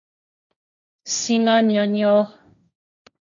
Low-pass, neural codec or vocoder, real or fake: 7.2 kHz; codec, 16 kHz, 1.1 kbps, Voila-Tokenizer; fake